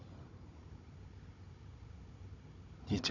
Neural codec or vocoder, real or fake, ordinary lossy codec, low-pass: codec, 16 kHz, 16 kbps, FunCodec, trained on Chinese and English, 50 frames a second; fake; none; 7.2 kHz